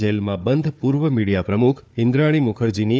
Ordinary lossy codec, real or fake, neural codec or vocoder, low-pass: none; fake; codec, 16 kHz, 4 kbps, FunCodec, trained on Chinese and English, 50 frames a second; none